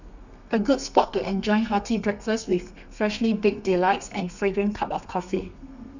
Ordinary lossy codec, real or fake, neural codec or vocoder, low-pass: none; fake; codec, 32 kHz, 1.9 kbps, SNAC; 7.2 kHz